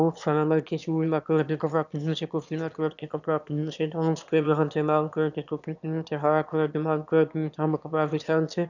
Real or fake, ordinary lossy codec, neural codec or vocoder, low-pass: fake; none; autoencoder, 22.05 kHz, a latent of 192 numbers a frame, VITS, trained on one speaker; 7.2 kHz